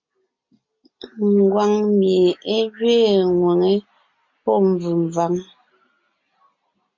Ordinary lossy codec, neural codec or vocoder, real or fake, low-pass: MP3, 48 kbps; none; real; 7.2 kHz